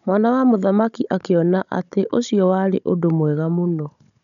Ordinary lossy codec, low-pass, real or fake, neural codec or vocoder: none; 7.2 kHz; fake; codec, 16 kHz, 16 kbps, FunCodec, trained on Chinese and English, 50 frames a second